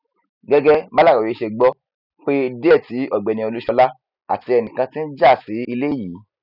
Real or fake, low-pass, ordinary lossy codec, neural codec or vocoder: real; 5.4 kHz; none; none